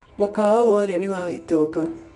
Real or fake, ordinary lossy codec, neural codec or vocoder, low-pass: fake; none; codec, 24 kHz, 0.9 kbps, WavTokenizer, medium music audio release; 10.8 kHz